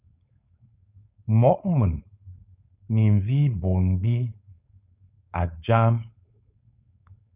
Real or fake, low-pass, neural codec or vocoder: fake; 3.6 kHz; codec, 16 kHz, 4.8 kbps, FACodec